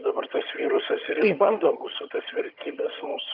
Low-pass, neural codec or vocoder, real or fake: 5.4 kHz; vocoder, 22.05 kHz, 80 mel bands, HiFi-GAN; fake